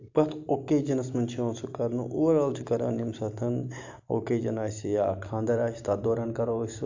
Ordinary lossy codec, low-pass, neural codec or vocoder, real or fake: AAC, 48 kbps; 7.2 kHz; none; real